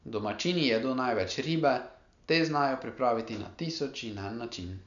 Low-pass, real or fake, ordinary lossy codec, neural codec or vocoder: 7.2 kHz; real; none; none